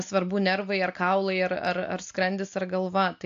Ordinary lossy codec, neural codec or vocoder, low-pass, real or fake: AAC, 64 kbps; none; 7.2 kHz; real